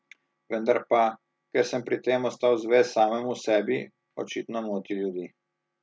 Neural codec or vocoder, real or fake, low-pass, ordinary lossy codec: none; real; none; none